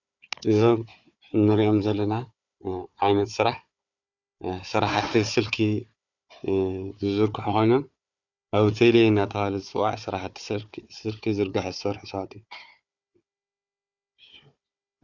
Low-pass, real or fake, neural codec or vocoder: 7.2 kHz; fake; codec, 16 kHz, 4 kbps, FunCodec, trained on Chinese and English, 50 frames a second